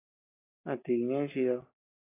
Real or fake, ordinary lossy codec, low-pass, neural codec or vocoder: real; AAC, 16 kbps; 3.6 kHz; none